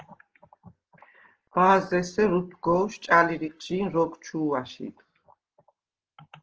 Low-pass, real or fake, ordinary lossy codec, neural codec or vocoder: 7.2 kHz; real; Opus, 16 kbps; none